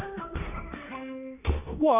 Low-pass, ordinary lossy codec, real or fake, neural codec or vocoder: 3.6 kHz; none; fake; codec, 16 kHz in and 24 kHz out, 0.9 kbps, LongCat-Audio-Codec, four codebook decoder